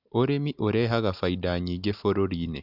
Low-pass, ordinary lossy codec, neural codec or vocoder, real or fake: 5.4 kHz; none; none; real